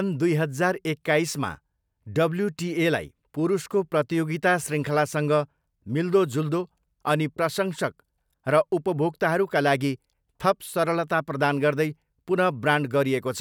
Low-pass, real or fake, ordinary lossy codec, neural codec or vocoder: none; real; none; none